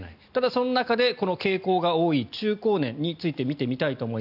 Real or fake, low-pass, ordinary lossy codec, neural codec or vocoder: real; 5.4 kHz; none; none